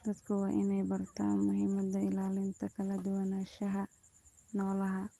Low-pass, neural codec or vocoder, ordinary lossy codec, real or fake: 14.4 kHz; none; Opus, 24 kbps; real